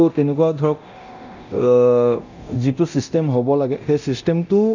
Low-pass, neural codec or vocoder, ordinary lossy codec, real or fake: 7.2 kHz; codec, 24 kHz, 0.9 kbps, DualCodec; none; fake